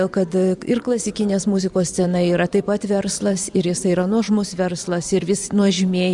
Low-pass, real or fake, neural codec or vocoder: 10.8 kHz; fake; vocoder, 44.1 kHz, 128 mel bands every 512 samples, BigVGAN v2